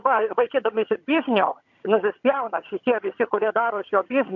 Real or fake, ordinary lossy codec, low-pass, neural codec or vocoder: fake; MP3, 48 kbps; 7.2 kHz; vocoder, 22.05 kHz, 80 mel bands, HiFi-GAN